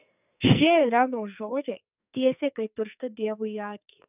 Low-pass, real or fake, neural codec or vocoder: 3.6 kHz; fake; codec, 44.1 kHz, 2.6 kbps, SNAC